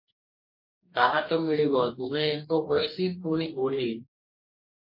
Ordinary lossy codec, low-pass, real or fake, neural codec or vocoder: MP3, 32 kbps; 5.4 kHz; fake; codec, 44.1 kHz, 2.6 kbps, DAC